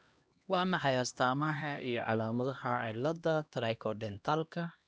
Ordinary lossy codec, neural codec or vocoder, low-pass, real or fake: none; codec, 16 kHz, 1 kbps, X-Codec, HuBERT features, trained on LibriSpeech; none; fake